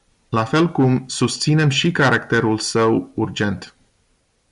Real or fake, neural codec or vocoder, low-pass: real; none; 10.8 kHz